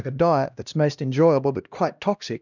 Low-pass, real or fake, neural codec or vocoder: 7.2 kHz; fake; codec, 16 kHz, 1 kbps, X-Codec, HuBERT features, trained on LibriSpeech